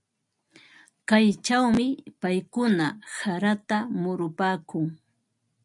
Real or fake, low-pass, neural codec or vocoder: real; 10.8 kHz; none